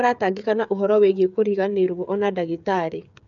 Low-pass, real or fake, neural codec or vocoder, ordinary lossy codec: 7.2 kHz; fake; codec, 16 kHz, 8 kbps, FreqCodec, smaller model; none